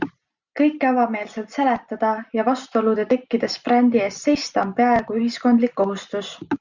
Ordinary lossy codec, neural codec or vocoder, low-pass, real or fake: Opus, 64 kbps; none; 7.2 kHz; real